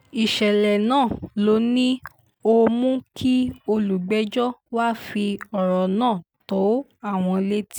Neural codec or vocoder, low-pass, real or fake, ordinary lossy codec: none; none; real; none